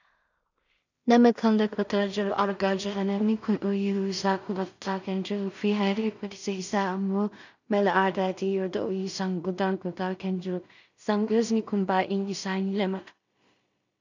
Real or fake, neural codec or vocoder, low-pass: fake; codec, 16 kHz in and 24 kHz out, 0.4 kbps, LongCat-Audio-Codec, two codebook decoder; 7.2 kHz